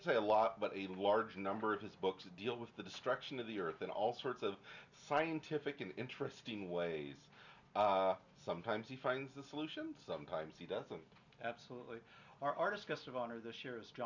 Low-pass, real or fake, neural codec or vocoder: 7.2 kHz; real; none